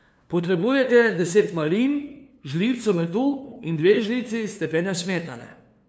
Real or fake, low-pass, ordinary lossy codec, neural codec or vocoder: fake; none; none; codec, 16 kHz, 2 kbps, FunCodec, trained on LibriTTS, 25 frames a second